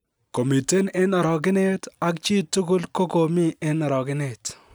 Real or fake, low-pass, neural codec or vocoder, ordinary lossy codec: real; none; none; none